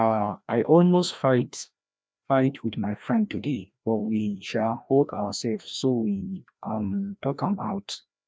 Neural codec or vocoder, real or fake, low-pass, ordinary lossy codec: codec, 16 kHz, 1 kbps, FreqCodec, larger model; fake; none; none